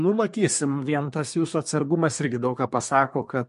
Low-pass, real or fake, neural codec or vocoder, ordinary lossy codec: 10.8 kHz; fake; codec, 24 kHz, 1 kbps, SNAC; MP3, 48 kbps